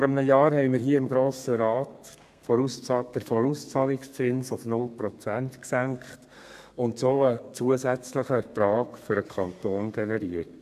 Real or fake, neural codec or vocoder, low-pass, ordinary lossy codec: fake; codec, 32 kHz, 1.9 kbps, SNAC; 14.4 kHz; AAC, 96 kbps